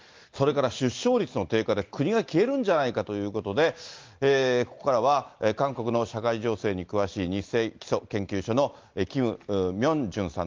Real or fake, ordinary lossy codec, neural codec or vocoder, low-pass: real; Opus, 32 kbps; none; 7.2 kHz